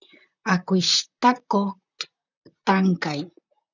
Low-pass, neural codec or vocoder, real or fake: 7.2 kHz; vocoder, 44.1 kHz, 128 mel bands, Pupu-Vocoder; fake